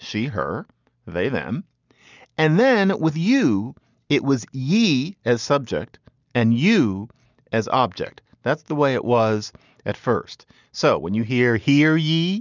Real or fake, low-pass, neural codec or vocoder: real; 7.2 kHz; none